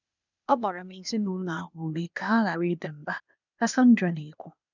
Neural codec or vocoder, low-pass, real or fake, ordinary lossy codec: codec, 16 kHz, 0.8 kbps, ZipCodec; 7.2 kHz; fake; none